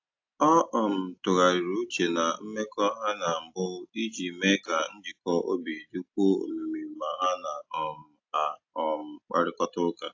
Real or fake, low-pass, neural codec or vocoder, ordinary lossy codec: real; 7.2 kHz; none; AAC, 48 kbps